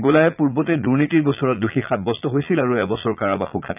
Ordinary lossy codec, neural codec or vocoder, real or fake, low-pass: none; vocoder, 22.05 kHz, 80 mel bands, Vocos; fake; 3.6 kHz